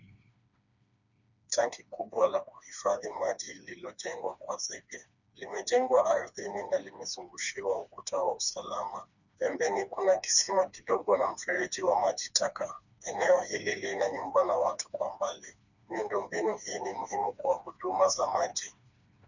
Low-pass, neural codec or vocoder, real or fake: 7.2 kHz; codec, 16 kHz, 2 kbps, FreqCodec, smaller model; fake